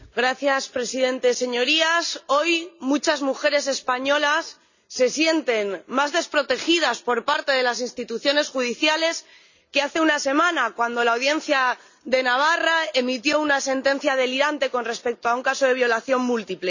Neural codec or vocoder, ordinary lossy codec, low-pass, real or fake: none; none; 7.2 kHz; real